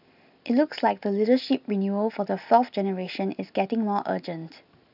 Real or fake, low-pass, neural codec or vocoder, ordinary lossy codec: real; 5.4 kHz; none; none